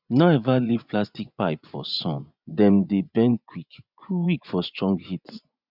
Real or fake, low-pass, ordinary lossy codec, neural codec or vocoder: fake; 5.4 kHz; none; vocoder, 24 kHz, 100 mel bands, Vocos